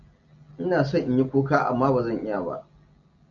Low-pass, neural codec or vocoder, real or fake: 7.2 kHz; none; real